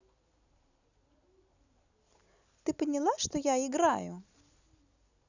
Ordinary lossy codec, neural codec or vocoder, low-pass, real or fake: none; none; 7.2 kHz; real